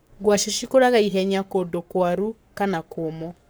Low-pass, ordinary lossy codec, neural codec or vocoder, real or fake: none; none; codec, 44.1 kHz, 7.8 kbps, Pupu-Codec; fake